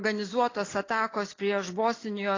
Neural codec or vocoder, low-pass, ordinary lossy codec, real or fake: none; 7.2 kHz; AAC, 32 kbps; real